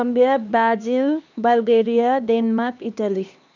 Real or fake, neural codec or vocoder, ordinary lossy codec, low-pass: fake; codec, 16 kHz, 2 kbps, X-Codec, HuBERT features, trained on LibriSpeech; none; 7.2 kHz